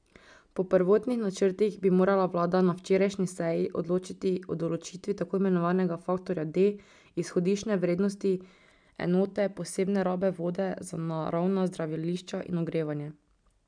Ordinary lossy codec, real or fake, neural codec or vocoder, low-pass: none; real; none; 9.9 kHz